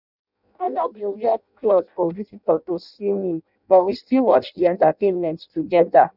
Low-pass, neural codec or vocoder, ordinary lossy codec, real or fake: 5.4 kHz; codec, 16 kHz in and 24 kHz out, 0.6 kbps, FireRedTTS-2 codec; none; fake